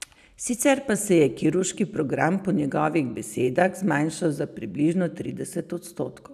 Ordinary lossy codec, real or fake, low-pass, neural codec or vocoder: none; real; 14.4 kHz; none